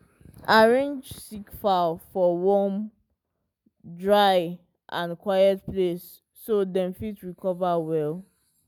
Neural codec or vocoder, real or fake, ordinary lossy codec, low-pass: none; real; none; none